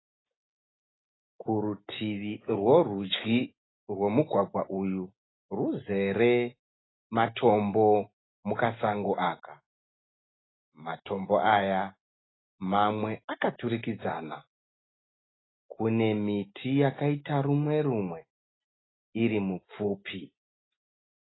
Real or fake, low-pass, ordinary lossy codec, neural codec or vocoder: real; 7.2 kHz; AAC, 16 kbps; none